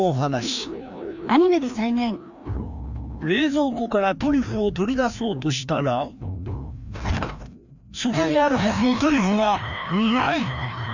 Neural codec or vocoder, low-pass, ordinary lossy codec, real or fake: codec, 16 kHz, 1 kbps, FreqCodec, larger model; 7.2 kHz; none; fake